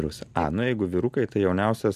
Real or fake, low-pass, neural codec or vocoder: real; 14.4 kHz; none